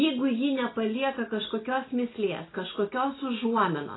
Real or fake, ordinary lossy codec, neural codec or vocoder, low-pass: real; AAC, 16 kbps; none; 7.2 kHz